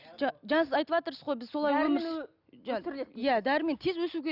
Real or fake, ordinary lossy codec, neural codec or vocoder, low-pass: real; none; none; 5.4 kHz